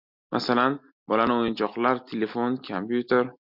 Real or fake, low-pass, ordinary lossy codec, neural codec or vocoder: real; 5.4 kHz; MP3, 48 kbps; none